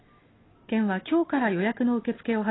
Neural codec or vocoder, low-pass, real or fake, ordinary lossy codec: none; 7.2 kHz; real; AAC, 16 kbps